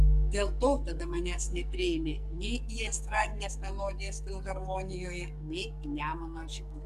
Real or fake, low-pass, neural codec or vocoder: fake; 14.4 kHz; codec, 44.1 kHz, 2.6 kbps, SNAC